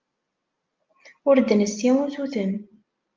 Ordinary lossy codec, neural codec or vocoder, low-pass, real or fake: Opus, 24 kbps; none; 7.2 kHz; real